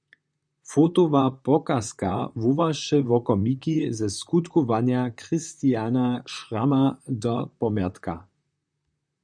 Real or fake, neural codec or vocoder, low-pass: fake; vocoder, 44.1 kHz, 128 mel bands, Pupu-Vocoder; 9.9 kHz